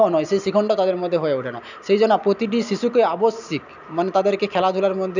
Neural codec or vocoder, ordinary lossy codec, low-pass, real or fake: none; none; 7.2 kHz; real